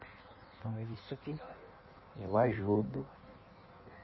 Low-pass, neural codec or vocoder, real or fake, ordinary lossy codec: 7.2 kHz; codec, 16 kHz in and 24 kHz out, 1.1 kbps, FireRedTTS-2 codec; fake; MP3, 24 kbps